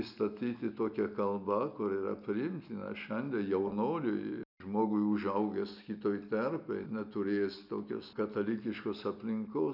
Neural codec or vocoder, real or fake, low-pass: none; real; 5.4 kHz